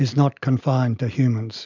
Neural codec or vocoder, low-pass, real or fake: none; 7.2 kHz; real